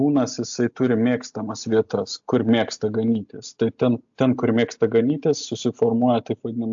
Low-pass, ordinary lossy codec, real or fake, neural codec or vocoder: 7.2 kHz; AAC, 64 kbps; real; none